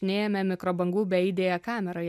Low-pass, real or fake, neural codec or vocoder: 14.4 kHz; real; none